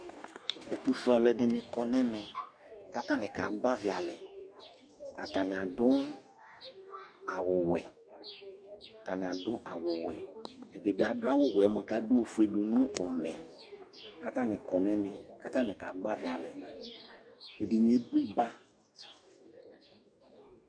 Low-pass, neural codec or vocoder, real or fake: 9.9 kHz; codec, 44.1 kHz, 2.6 kbps, DAC; fake